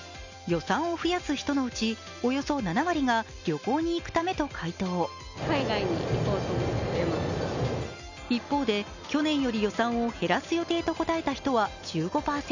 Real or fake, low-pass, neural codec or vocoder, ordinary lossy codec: real; 7.2 kHz; none; none